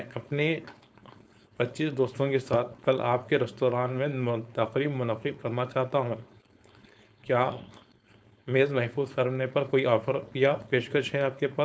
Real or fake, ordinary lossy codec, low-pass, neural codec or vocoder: fake; none; none; codec, 16 kHz, 4.8 kbps, FACodec